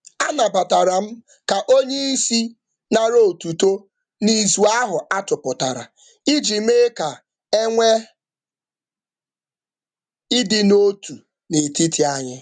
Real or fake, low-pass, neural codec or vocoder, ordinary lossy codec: real; 9.9 kHz; none; none